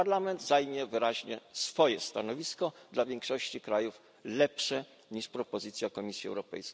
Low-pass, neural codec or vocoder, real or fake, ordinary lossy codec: none; none; real; none